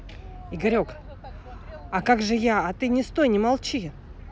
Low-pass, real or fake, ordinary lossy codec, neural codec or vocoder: none; real; none; none